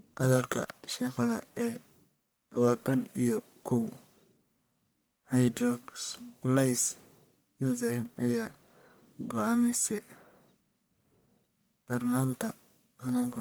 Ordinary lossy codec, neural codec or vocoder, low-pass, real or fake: none; codec, 44.1 kHz, 1.7 kbps, Pupu-Codec; none; fake